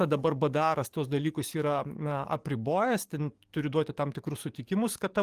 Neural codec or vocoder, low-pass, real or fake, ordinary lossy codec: codec, 44.1 kHz, 7.8 kbps, DAC; 14.4 kHz; fake; Opus, 24 kbps